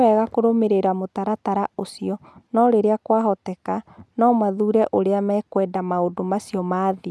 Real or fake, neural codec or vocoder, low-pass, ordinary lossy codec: real; none; none; none